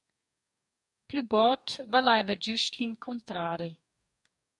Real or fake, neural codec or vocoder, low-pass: fake; codec, 44.1 kHz, 2.6 kbps, DAC; 10.8 kHz